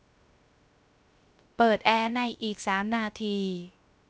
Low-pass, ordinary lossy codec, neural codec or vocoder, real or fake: none; none; codec, 16 kHz, 0.3 kbps, FocalCodec; fake